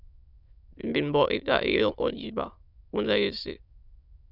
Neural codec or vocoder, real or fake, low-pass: autoencoder, 22.05 kHz, a latent of 192 numbers a frame, VITS, trained on many speakers; fake; 5.4 kHz